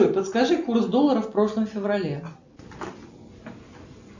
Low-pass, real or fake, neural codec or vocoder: 7.2 kHz; real; none